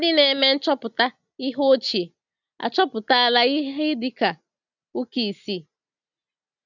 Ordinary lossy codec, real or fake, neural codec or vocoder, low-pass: none; real; none; 7.2 kHz